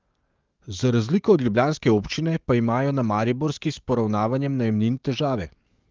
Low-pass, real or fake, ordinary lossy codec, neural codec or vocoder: 7.2 kHz; fake; Opus, 24 kbps; codec, 44.1 kHz, 7.8 kbps, DAC